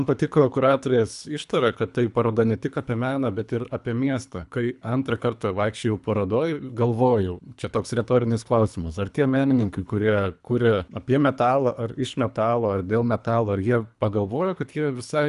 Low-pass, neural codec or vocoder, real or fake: 10.8 kHz; codec, 24 kHz, 3 kbps, HILCodec; fake